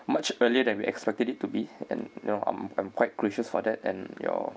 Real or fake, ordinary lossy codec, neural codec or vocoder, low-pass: real; none; none; none